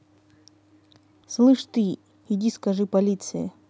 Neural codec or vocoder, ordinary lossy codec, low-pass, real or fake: none; none; none; real